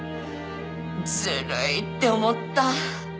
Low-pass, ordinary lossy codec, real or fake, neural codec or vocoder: none; none; real; none